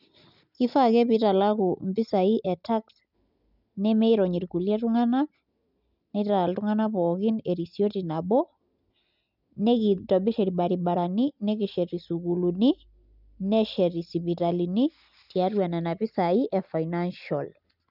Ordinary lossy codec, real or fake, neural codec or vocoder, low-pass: none; real; none; 5.4 kHz